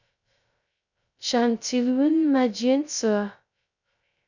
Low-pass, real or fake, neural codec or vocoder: 7.2 kHz; fake; codec, 16 kHz, 0.2 kbps, FocalCodec